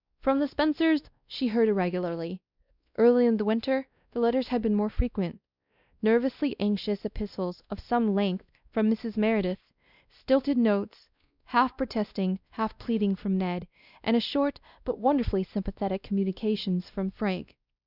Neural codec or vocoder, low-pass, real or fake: codec, 16 kHz, 1 kbps, X-Codec, WavLM features, trained on Multilingual LibriSpeech; 5.4 kHz; fake